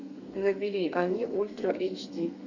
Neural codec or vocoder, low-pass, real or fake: codec, 32 kHz, 1.9 kbps, SNAC; 7.2 kHz; fake